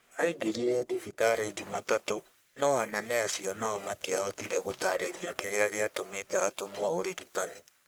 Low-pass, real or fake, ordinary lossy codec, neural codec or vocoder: none; fake; none; codec, 44.1 kHz, 1.7 kbps, Pupu-Codec